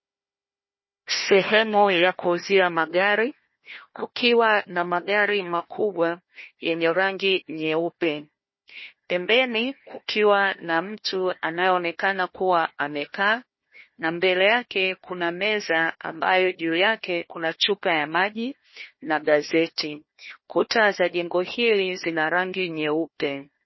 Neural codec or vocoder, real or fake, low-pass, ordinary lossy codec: codec, 16 kHz, 1 kbps, FunCodec, trained on Chinese and English, 50 frames a second; fake; 7.2 kHz; MP3, 24 kbps